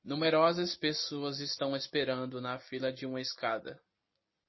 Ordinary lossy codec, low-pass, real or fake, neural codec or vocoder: MP3, 24 kbps; 7.2 kHz; real; none